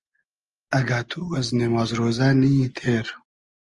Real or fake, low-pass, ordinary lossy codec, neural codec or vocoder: real; 10.8 kHz; Opus, 24 kbps; none